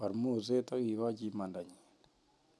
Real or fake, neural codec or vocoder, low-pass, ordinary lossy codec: real; none; none; none